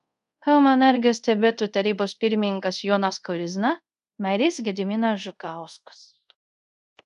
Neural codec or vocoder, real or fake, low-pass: codec, 24 kHz, 0.5 kbps, DualCodec; fake; 10.8 kHz